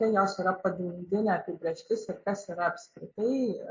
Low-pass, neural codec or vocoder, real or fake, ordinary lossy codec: 7.2 kHz; none; real; MP3, 48 kbps